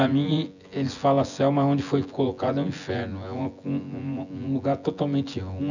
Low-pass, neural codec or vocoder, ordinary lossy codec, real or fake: 7.2 kHz; vocoder, 24 kHz, 100 mel bands, Vocos; none; fake